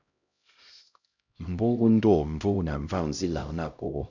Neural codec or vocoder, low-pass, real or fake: codec, 16 kHz, 0.5 kbps, X-Codec, HuBERT features, trained on LibriSpeech; 7.2 kHz; fake